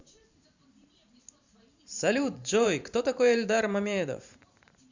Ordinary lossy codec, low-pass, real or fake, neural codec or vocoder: Opus, 64 kbps; 7.2 kHz; fake; vocoder, 44.1 kHz, 128 mel bands every 512 samples, BigVGAN v2